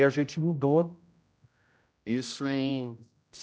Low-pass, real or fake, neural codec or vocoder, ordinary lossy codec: none; fake; codec, 16 kHz, 0.5 kbps, X-Codec, HuBERT features, trained on general audio; none